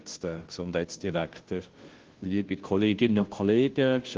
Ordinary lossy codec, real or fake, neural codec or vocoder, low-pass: Opus, 16 kbps; fake; codec, 16 kHz, 0.5 kbps, FunCodec, trained on Chinese and English, 25 frames a second; 7.2 kHz